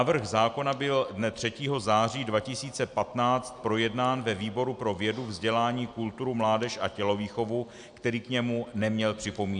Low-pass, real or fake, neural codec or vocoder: 9.9 kHz; real; none